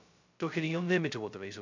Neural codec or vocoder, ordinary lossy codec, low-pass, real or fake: codec, 16 kHz, 0.2 kbps, FocalCodec; MP3, 64 kbps; 7.2 kHz; fake